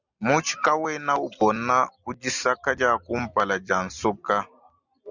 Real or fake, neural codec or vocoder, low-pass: real; none; 7.2 kHz